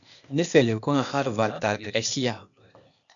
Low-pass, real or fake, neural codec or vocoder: 7.2 kHz; fake; codec, 16 kHz, 0.8 kbps, ZipCodec